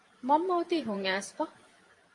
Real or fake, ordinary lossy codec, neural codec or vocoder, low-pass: fake; AAC, 48 kbps; vocoder, 44.1 kHz, 128 mel bands every 512 samples, BigVGAN v2; 10.8 kHz